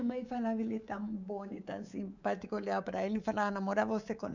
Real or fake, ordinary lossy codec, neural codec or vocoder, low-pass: fake; none; codec, 24 kHz, 3.1 kbps, DualCodec; 7.2 kHz